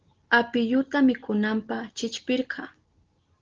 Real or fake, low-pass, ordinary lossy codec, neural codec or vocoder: real; 7.2 kHz; Opus, 16 kbps; none